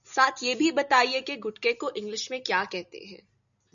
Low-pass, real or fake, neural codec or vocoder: 7.2 kHz; real; none